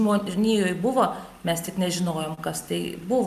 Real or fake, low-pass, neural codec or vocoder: real; 14.4 kHz; none